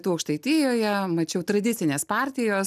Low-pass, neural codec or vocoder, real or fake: 14.4 kHz; none; real